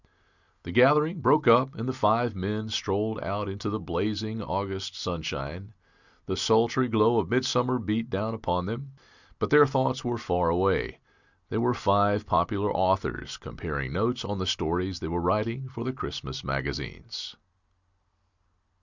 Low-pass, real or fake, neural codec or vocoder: 7.2 kHz; real; none